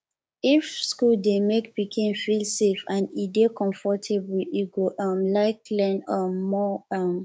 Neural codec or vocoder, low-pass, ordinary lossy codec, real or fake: codec, 16 kHz, 6 kbps, DAC; none; none; fake